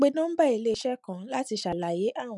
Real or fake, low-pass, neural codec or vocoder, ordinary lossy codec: real; 10.8 kHz; none; none